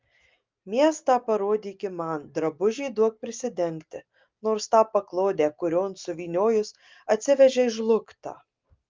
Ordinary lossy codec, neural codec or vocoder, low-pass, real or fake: Opus, 24 kbps; none; 7.2 kHz; real